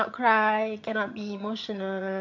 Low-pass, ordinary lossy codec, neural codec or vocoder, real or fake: 7.2 kHz; MP3, 48 kbps; vocoder, 22.05 kHz, 80 mel bands, HiFi-GAN; fake